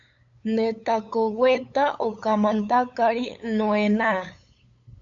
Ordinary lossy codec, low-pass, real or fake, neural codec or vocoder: AAC, 64 kbps; 7.2 kHz; fake; codec, 16 kHz, 8 kbps, FunCodec, trained on LibriTTS, 25 frames a second